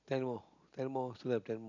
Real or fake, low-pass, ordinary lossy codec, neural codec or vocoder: real; 7.2 kHz; none; none